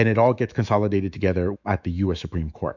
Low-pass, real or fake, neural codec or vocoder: 7.2 kHz; fake; autoencoder, 48 kHz, 128 numbers a frame, DAC-VAE, trained on Japanese speech